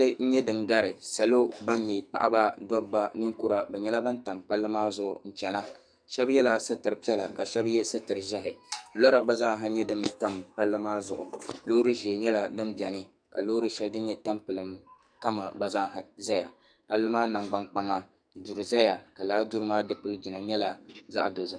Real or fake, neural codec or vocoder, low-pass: fake; codec, 44.1 kHz, 2.6 kbps, SNAC; 9.9 kHz